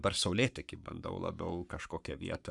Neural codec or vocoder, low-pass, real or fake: codec, 44.1 kHz, 7.8 kbps, Pupu-Codec; 10.8 kHz; fake